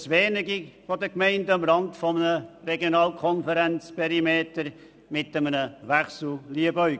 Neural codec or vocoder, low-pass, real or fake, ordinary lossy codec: none; none; real; none